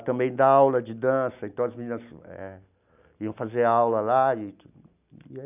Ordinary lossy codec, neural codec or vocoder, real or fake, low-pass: none; none; real; 3.6 kHz